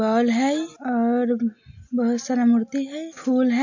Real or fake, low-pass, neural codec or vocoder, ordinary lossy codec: real; 7.2 kHz; none; AAC, 48 kbps